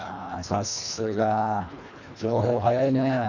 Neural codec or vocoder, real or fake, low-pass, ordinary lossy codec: codec, 24 kHz, 1.5 kbps, HILCodec; fake; 7.2 kHz; none